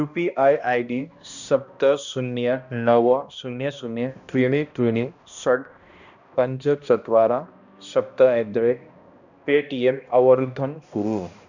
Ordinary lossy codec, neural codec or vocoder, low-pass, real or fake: none; codec, 16 kHz, 1 kbps, X-Codec, HuBERT features, trained on balanced general audio; 7.2 kHz; fake